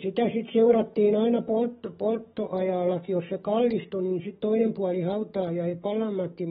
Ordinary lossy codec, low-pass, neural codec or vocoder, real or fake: AAC, 16 kbps; 19.8 kHz; codec, 44.1 kHz, 7.8 kbps, DAC; fake